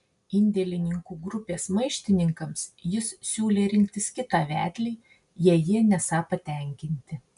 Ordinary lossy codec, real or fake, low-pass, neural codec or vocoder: MP3, 96 kbps; real; 10.8 kHz; none